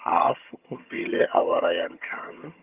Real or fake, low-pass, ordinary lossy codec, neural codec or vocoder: fake; 3.6 kHz; Opus, 32 kbps; vocoder, 22.05 kHz, 80 mel bands, HiFi-GAN